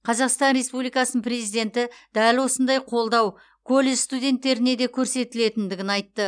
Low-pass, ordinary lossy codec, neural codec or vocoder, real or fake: 9.9 kHz; none; none; real